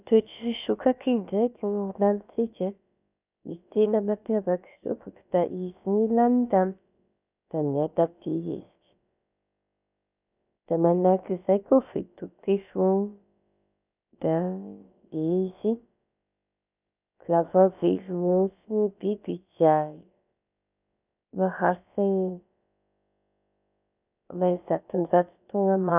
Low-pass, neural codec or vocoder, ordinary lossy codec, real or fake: 3.6 kHz; codec, 16 kHz, about 1 kbps, DyCAST, with the encoder's durations; none; fake